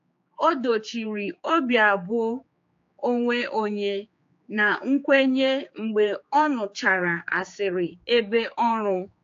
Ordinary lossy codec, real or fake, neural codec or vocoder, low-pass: AAC, 48 kbps; fake; codec, 16 kHz, 4 kbps, X-Codec, HuBERT features, trained on general audio; 7.2 kHz